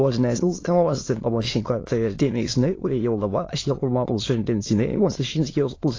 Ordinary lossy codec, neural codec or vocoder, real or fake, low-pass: AAC, 32 kbps; autoencoder, 22.05 kHz, a latent of 192 numbers a frame, VITS, trained on many speakers; fake; 7.2 kHz